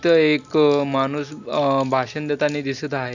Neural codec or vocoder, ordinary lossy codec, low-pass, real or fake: none; none; 7.2 kHz; real